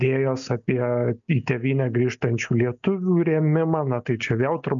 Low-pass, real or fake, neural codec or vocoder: 7.2 kHz; real; none